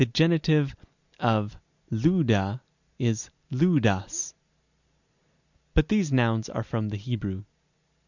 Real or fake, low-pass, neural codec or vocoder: real; 7.2 kHz; none